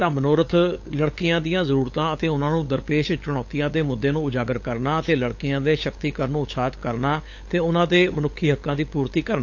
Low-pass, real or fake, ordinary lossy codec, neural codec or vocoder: 7.2 kHz; fake; AAC, 48 kbps; codec, 16 kHz, 8 kbps, FunCodec, trained on LibriTTS, 25 frames a second